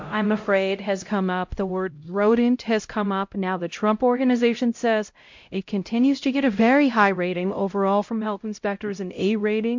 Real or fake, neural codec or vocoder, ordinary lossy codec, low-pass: fake; codec, 16 kHz, 0.5 kbps, X-Codec, HuBERT features, trained on LibriSpeech; MP3, 48 kbps; 7.2 kHz